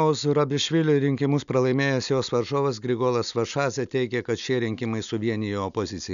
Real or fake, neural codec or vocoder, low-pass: real; none; 7.2 kHz